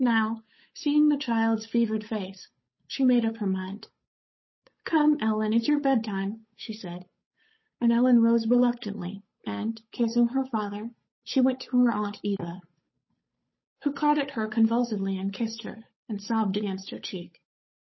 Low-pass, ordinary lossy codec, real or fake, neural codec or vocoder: 7.2 kHz; MP3, 24 kbps; fake; codec, 16 kHz, 8 kbps, FunCodec, trained on Chinese and English, 25 frames a second